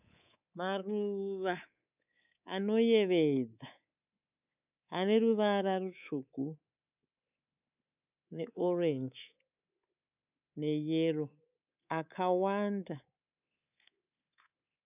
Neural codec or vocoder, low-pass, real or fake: codec, 24 kHz, 3.1 kbps, DualCodec; 3.6 kHz; fake